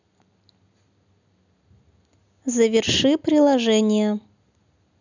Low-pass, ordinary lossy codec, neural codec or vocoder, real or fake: 7.2 kHz; none; none; real